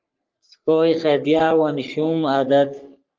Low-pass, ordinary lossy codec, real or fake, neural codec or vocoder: 7.2 kHz; Opus, 24 kbps; fake; codec, 44.1 kHz, 3.4 kbps, Pupu-Codec